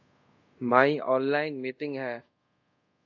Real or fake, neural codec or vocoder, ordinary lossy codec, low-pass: fake; codec, 24 kHz, 0.5 kbps, DualCodec; AAC, 48 kbps; 7.2 kHz